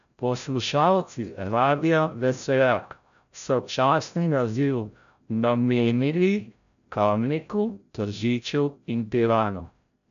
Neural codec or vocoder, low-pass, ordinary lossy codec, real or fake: codec, 16 kHz, 0.5 kbps, FreqCodec, larger model; 7.2 kHz; none; fake